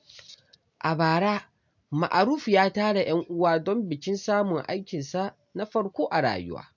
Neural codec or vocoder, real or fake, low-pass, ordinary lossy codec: none; real; 7.2 kHz; MP3, 64 kbps